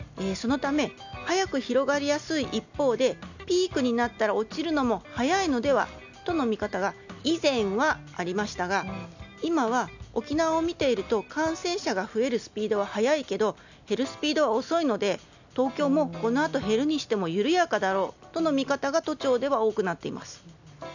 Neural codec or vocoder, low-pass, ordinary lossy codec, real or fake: none; 7.2 kHz; none; real